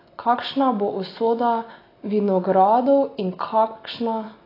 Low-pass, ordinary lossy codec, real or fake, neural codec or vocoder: 5.4 kHz; AAC, 24 kbps; real; none